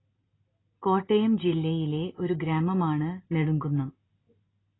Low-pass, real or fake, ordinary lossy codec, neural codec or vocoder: 7.2 kHz; real; AAC, 16 kbps; none